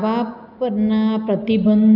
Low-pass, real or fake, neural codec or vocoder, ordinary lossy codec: 5.4 kHz; real; none; none